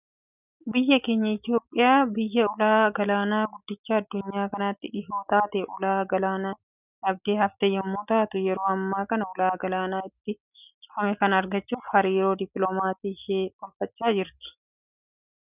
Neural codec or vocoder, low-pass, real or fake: none; 3.6 kHz; real